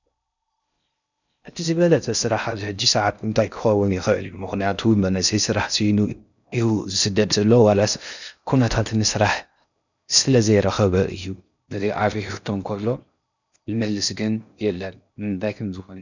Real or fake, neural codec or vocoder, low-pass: fake; codec, 16 kHz in and 24 kHz out, 0.6 kbps, FocalCodec, streaming, 4096 codes; 7.2 kHz